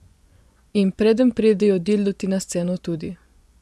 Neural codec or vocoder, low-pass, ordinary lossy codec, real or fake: vocoder, 24 kHz, 100 mel bands, Vocos; none; none; fake